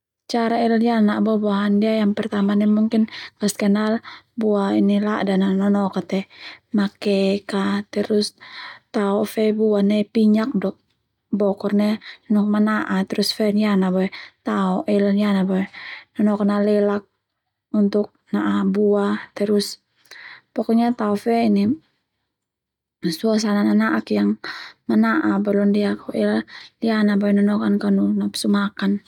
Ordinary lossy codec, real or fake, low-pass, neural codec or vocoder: none; real; 19.8 kHz; none